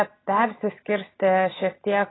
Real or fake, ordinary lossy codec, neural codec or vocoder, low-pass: real; AAC, 16 kbps; none; 7.2 kHz